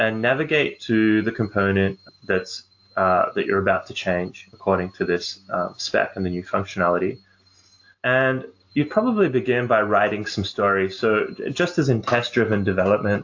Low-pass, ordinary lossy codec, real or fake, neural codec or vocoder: 7.2 kHz; AAC, 48 kbps; real; none